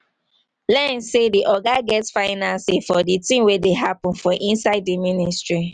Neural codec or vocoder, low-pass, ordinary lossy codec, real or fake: none; 9.9 kHz; none; real